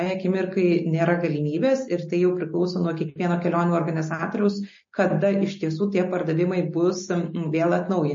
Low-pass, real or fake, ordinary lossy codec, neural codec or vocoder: 7.2 kHz; real; MP3, 32 kbps; none